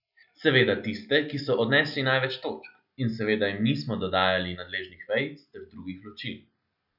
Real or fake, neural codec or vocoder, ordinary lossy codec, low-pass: real; none; none; 5.4 kHz